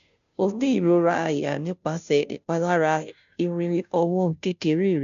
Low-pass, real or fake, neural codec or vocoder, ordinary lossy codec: 7.2 kHz; fake; codec, 16 kHz, 0.5 kbps, FunCodec, trained on Chinese and English, 25 frames a second; MP3, 64 kbps